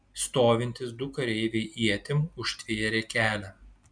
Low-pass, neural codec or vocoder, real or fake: 9.9 kHz; none; real